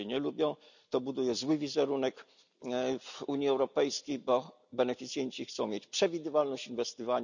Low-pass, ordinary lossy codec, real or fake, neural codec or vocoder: 7.2 kHz; none; real; none